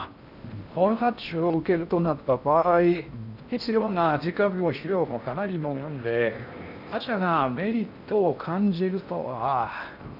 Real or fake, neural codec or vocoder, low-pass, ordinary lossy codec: fake; codec, 16 kHz in and 24 kHz out, 0.6 kbps, FocalCodec, streaming, 4096 codes; 5.4 kHz; none